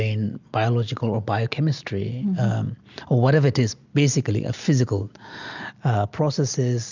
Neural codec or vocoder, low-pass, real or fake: none; 7.2 kHz; real